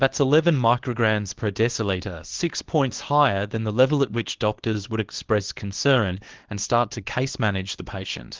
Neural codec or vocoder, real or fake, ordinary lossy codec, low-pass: codec, 24 kHz, 0.9 kbps, WavTokenizer, small release; fake; Opus, 16 kbps; 7.2 kHz